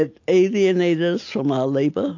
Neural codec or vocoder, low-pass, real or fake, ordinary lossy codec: none; 7.2 kHz; real; AAC, 48 kbps